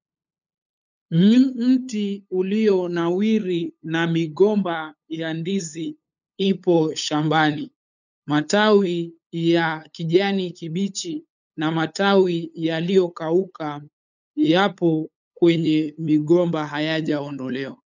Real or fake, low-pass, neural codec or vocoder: fake; 7.2 kHz; codec, 16 kHz, 8 kbps, FunCodec, trained on LibriTTS, 25 frames a second